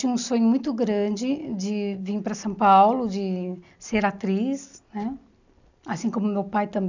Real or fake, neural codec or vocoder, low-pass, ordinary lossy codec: real; none; 7.2 kHz; none